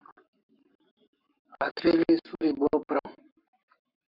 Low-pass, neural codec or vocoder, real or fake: 5.4 kHz; codec, 44.1 kHz, 7.8 kbps, Pupu-Codec; fake